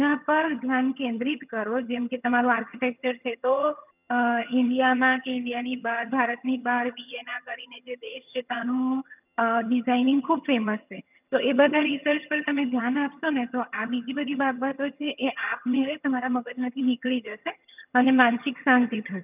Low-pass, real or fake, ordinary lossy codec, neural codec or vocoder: 3.6 kHz; fake; none; vocoder, 22.05 kHz, 80 mel bands, HiFi-GAN